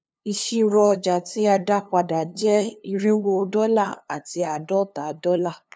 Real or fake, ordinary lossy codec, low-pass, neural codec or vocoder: fake; none; none; codec, 16 kHz, 2 kbps, FunCodec, trained on LibriTTS, 25 frames a second